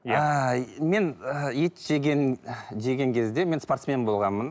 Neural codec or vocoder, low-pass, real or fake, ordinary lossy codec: none; none; real; none